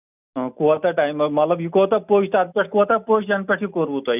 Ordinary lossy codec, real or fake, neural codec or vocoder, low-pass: none; real; none; 3.6 kHz